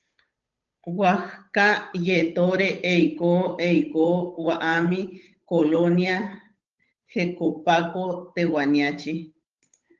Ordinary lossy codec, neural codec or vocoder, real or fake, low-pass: Opus, 24 kbps; codec, 16 kHz, 8 kbps, FunCodec, trained on Chinese and English, 25 frames a second; fake; 7.2 kHz